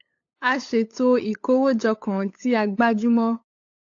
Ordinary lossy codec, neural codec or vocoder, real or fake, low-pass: AAC, 48 kbps; codec, 16 kHz, 8 kbps, FunCodec, trained on LibriTTS, 25 frames a second; fake; 7.2 kHz